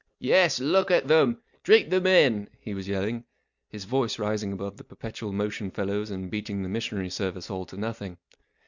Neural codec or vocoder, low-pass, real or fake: none; 7.2 kHz; real